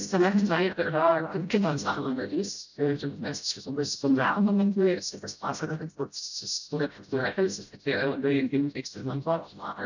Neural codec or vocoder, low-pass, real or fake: codec, 16 kHz, 0.5 kbps, FreqCodec, smaller model; 7.2 kHz; fake